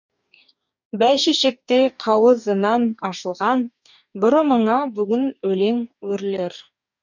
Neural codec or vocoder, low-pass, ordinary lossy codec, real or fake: codec, 44.1 kHz, 2.6 kbps, DAC; 7.2 kHz; none; fake